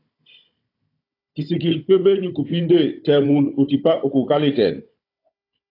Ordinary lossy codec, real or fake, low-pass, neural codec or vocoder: AAC, 32 kbps; fake; 5.4 kHz; codec, 16 kHz, 16 kbps, FunCodec, trained on Chinese and English, 50 frames a second